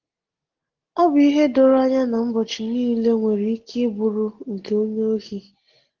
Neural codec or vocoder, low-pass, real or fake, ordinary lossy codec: none; 7.2 kHz; real; Opus, 16 kbps